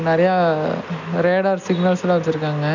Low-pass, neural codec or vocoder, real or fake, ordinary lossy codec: 7.2 kHz; none; real; none